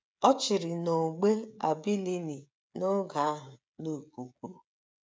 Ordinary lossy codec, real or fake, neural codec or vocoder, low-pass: none; fake; codec, 16 kHz, 16 kbps, FreqCodec, smaller model; none